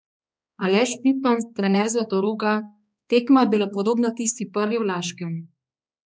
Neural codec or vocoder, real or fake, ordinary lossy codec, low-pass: codec, 16 kHz, 2 kbps, X-Codec, HuBERT features, trained on balanced general audio; fake; none; none